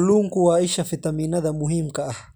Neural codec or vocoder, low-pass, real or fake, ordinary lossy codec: none; none; real; none